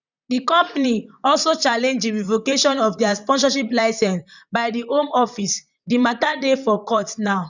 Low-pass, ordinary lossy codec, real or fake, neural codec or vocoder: 7.2 kHz; none; fake; vocoder, 44.1 kHz, 128 mel bands, Pupu-Vocoder